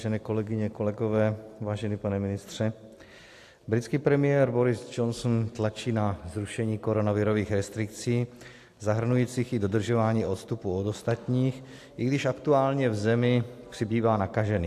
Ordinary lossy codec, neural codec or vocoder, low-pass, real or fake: AAC, 64 kbps; none; 14.4 kHz; real